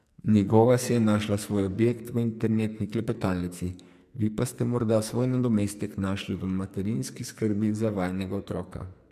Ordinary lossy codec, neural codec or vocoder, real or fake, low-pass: MP3, 96 kbps; codec, 44.1 kHz, 2.6 kbps, SNAC; fake; 14.4 kHz